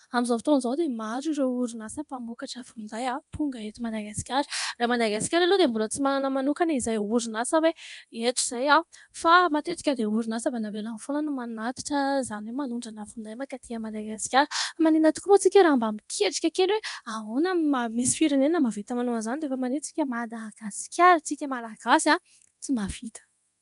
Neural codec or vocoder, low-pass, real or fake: codec, 24 kHz, 0.9 kbps, DualCodec; 10.8 kHz; fake